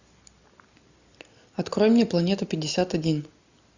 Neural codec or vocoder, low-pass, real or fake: none; 7.2 kHz; real